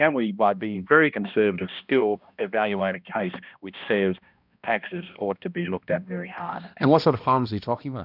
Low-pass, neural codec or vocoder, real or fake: 5.4 kHz; codec, 16 kHz, 1 kbps, X-Codec, HuBERT features, trained on balanced general audio; fake